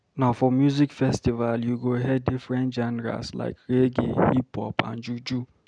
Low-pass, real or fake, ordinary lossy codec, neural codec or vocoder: 9.9 kHz; real; none; none